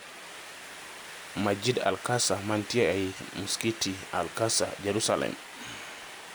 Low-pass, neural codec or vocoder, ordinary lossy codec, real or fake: none; none; none; real